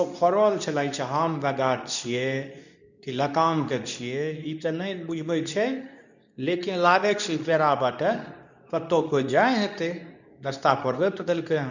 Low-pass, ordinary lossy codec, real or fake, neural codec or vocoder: 7.2 kHz; none; fake; codec, 24 kHz, 0.9 kbps, WavTokenizer, medium speech release version 2